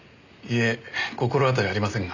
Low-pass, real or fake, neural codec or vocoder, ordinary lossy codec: 7.2 kHz; real; none; none